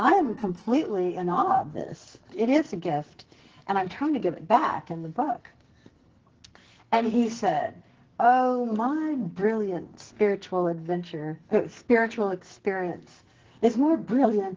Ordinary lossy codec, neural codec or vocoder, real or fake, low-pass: Opus, 16 kbps; codec, 44.1 kHz, 2.6 kbps, SNAC; fake; 7.2 kHz